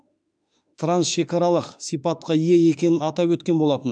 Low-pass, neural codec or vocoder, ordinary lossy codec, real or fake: 9.9 kHz; autoencoder, 48 kHz, 32 numbers a frame, DAC-VAE, trained on Japanese speech; none; fake